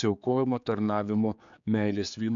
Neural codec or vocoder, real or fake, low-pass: codec, 16 kHz, 4 kbps, X-Codec, HuBERT features, trained on general audio; fake; 7.2 kHz